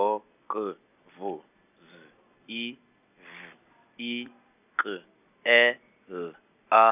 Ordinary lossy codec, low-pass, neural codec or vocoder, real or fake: none; 3.6 kHz; none; real